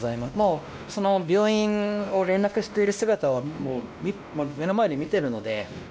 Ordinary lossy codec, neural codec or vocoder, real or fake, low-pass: none; codec, 16 kHz, 1 kbps, X-Codec, WavLM features, trained on Multilingual LibriSpeech; fake; none